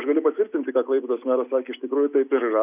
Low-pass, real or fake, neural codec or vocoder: 3.6 kHz; real; none